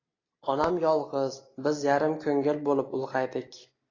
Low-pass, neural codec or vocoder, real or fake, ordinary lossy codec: 7.2 kHz; none; real; AAC, 32 kbps